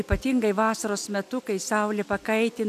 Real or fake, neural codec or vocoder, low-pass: real; none; 14.4 kHz